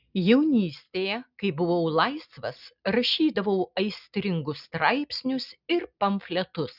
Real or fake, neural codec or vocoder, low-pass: real; none; 5.4 kHz